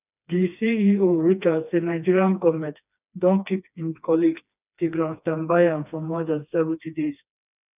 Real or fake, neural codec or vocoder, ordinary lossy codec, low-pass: fake; codec, 16 kHz, 2 kbps, FreqCodec, smaller model; none; 3.6 kHz